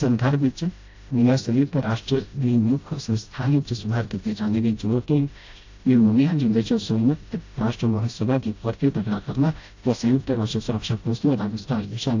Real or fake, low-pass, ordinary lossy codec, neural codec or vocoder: fake; 7.2 kHz; AAC, 48 kbps; codec, 16 kHz, 0.5 kbps, FreqCodec, smaller model